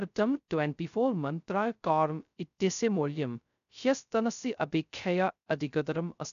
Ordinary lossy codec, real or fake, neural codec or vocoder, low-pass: none; fake; codec, 16 kHz, 0.2 kbps, FocalCodec; 7.2 kHz